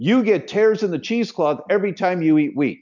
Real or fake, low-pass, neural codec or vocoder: real; 7.2 kHz; none